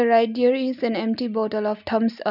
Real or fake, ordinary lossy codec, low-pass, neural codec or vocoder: real; none; 5.4 kHz; none